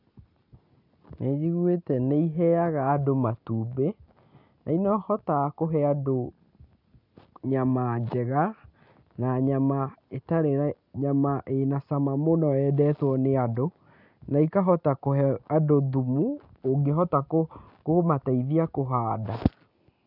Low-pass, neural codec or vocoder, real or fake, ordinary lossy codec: 5.4 kHz; none; real; none